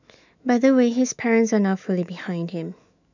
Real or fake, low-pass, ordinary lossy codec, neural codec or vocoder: fake; 7.2 kHz; none; autoencoder, 48 kHz, 128 numbers a frame, DAC-VAE, trained on Japanese speech